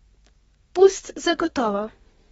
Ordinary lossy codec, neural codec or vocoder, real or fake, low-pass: AAC, 24 kbps; codec, 32 kHz, 1.9 kbps, SNAC; fake; 14.4 kHz